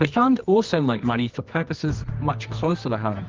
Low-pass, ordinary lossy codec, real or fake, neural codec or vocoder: 7.2 kHz; Opus, 24 kbps; fake; codec, 24 kHz, 0.9 kbps, WavTokenizer, medium music audio release